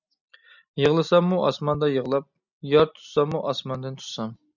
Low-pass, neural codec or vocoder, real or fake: 7.2 kHz; none; real